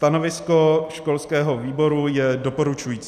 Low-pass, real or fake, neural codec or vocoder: 14.4 kHz; real; none